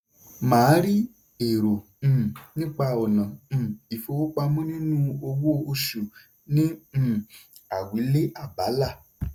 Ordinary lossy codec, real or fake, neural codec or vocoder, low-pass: none; real; none; none